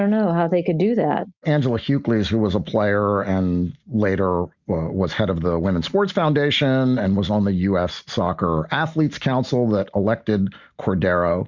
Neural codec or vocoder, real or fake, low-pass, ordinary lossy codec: none; real; 7.2 kHz; Opus, 64 kbps